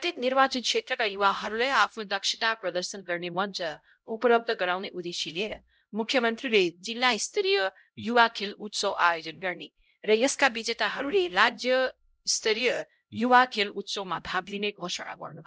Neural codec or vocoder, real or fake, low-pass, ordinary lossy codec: codec, 16 kHz, 0.5 kbps, X-Codec, HuBERT features, trained on LibriSpeech; fake; none; none